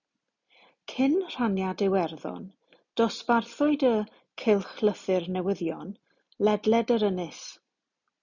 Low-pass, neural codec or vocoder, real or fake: 7.2 kHz; none; real